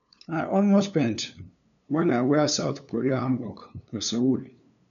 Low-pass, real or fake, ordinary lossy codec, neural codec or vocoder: 7.2 kHz; fake; none; codec, 16 kHz, 2 kbps, FunCodec, trained on LibriTTS, 25 frames a second